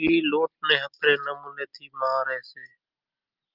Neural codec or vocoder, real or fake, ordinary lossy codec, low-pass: none; real; Opus, 24 kbps; 5.4 kHz